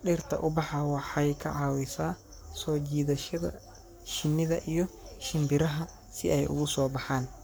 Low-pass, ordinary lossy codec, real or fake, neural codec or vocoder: none; none; fake; codec, 44.1 kHz, 7.8 kbps, Pupu-Codec